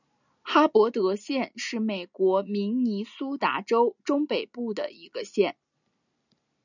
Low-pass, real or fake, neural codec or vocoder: 7.2 kHz; real; none